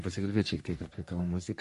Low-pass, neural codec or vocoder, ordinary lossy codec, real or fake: 14.4 kHz; codec, 44.1 kHz, 3.4 kbps, Pupu-Codec; MP3, 48 kbps; fake